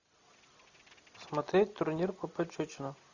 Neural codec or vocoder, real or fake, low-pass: none; real; 7.2 kHz